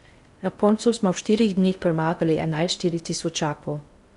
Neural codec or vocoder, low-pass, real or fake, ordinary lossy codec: codec, 16 kHz in and 24 kHz out, 0.6 kbps, FocalCodec, streaming, 4096 codes; 10.8 kHz; fake; Opus, 64 kbps